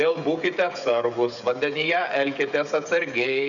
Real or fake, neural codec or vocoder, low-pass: fake; codec, 16 kHz, 16 kbps, FreqCodec, smaller model; 7.2 kHz